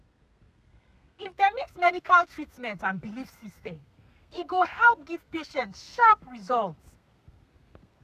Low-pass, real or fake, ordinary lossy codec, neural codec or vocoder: 14.4 kHz; fake; none; codec, 32 kHz, 1.9 kbps, SNAC